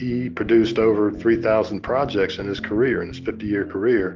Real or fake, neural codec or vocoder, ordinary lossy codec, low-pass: real; none; Opus, 24 kbps; 7.2 kHz